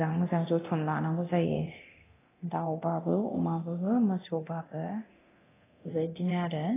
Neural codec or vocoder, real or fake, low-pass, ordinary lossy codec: codec, 24 kHz, 0.9 kbps, DualCodec; fake; 3.6 kHz; AAC, 16 kbps